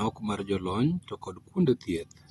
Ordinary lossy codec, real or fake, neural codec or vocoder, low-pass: AAC, 32 kbps; real; none; 19.8 kHz